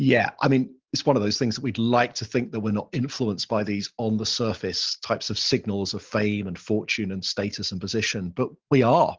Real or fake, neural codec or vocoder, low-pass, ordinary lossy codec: real; none; 7.2 kHz; Opus, 16 kbps